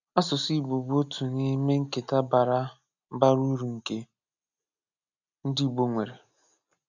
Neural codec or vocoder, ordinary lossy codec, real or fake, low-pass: none; none; real; 7.2 kHz